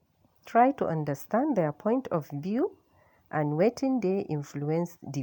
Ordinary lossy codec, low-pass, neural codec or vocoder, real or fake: MP3, 96 kbps; 19.8 kHz; none; real